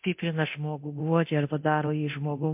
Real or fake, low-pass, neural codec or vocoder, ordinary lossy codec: fake; 3.6 kHz; codec, 24 kHz, 0.9 kbps, DualCodec; MP3, 32 kbps